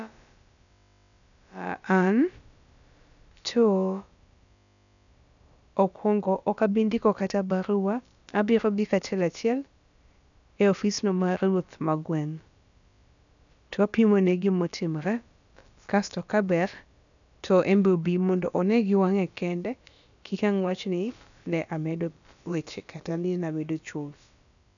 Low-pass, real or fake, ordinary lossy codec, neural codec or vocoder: 7.2 kHz; fake; none; codec, 16 kHz, about 1 kbps, DyCAST, with the encoder's durations